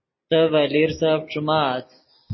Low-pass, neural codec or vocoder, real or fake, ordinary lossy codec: 7.2 kHz; none; real; MP3, 24 kbps